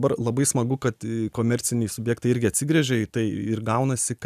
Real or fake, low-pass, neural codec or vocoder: real; 14.4 kHz; none